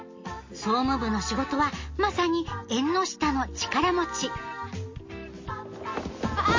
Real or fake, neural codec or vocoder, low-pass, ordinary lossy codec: real; none; 7.2 kHz; MP3, 32 kbps